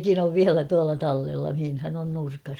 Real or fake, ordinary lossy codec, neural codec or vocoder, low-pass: real; Opus, 24 kbps; none; 19.8 kHz